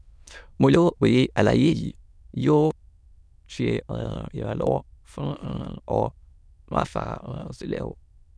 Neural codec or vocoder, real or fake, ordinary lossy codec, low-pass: autoencoder, 22.05 kHz, a latent of 192 numbers a frame, VITS, trained on many speakers; fake; none; none